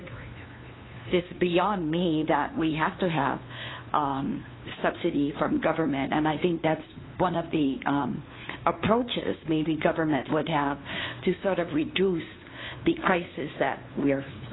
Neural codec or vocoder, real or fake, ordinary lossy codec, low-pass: codec, 16 kHz, 2 kbps, FunCodec, trained on LibriTTS, 25 frames a second; fake; AAC, 16 kbps; 7.2 kHz